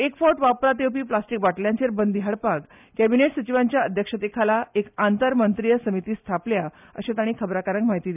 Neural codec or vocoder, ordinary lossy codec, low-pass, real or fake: none; none; 3.6 kHz; real